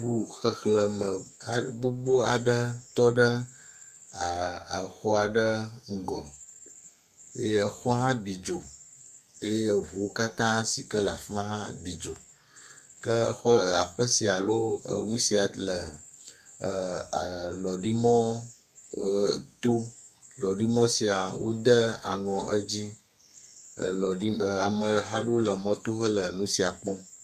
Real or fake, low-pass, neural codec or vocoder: fake; 14.4 kHz; codec, 32 kHz, 1.9 kbps, SNAC